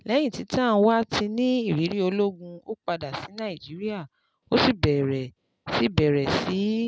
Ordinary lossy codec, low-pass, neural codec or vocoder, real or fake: none; none; none; real